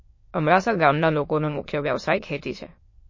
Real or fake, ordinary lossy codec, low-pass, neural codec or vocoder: fake; MP3, 32 kbps; 7.2 kHz; autoencoder, 22.05 kHz, a latent of 192 numbers a frame, VITS, trained on many speakers